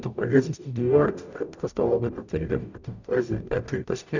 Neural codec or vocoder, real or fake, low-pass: codec, 44.1 kHz, 0.9 kbps, DAC; fake; 7.2 kHz